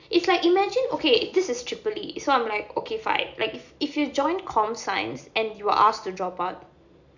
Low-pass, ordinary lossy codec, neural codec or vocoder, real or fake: 7.2 kHz; none; none; real